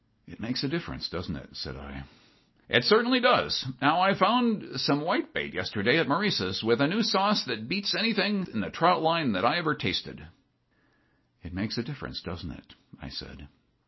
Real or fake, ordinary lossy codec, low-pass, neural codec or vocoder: real; MP3, 24 kbps; 7.2 kHz; none